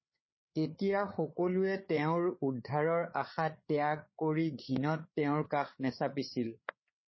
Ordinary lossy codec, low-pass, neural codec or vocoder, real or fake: MP3, 24 kbps; 7.2 kHz; codec, 16 kHz, 8 kbps, FreqCodec, larger model; fake